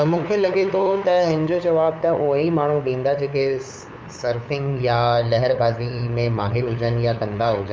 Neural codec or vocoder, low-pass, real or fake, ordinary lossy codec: codec, 16 kHz, 8 kbps, FunCodec, trained on LibriTTS, 25 frames a second; none; fake; none